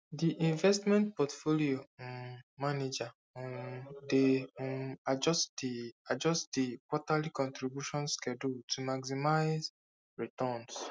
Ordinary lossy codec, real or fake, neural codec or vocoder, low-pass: none; real; none; none